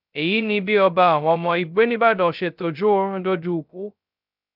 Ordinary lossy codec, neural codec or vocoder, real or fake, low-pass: none; codec, 16 kHz, 0.2 kbps, FocalCodec; fake; 5.4 kHz